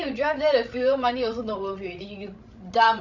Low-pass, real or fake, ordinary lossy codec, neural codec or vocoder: 7.2 kHz; fake; none; codec, 16 kHz, 16 kbps, FreqCodec, larger model